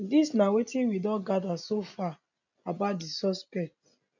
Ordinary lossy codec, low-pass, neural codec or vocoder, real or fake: none; 7.2 kHz; none; real